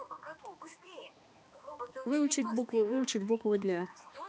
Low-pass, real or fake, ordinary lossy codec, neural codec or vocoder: none; fake; none; codec, 16 kHz, 2 kbps, X-Codec, HuBERT features, trained on balanced general audio